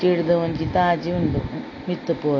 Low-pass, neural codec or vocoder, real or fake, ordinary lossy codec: 7.2 kHz; none; real; AAC, 32 kbps